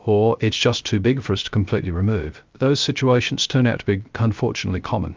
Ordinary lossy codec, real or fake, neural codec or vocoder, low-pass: Opus, 24 kbps; fake; codec, 16 kHz, 0.3 kbps, FocalCodec; 7.2 kHz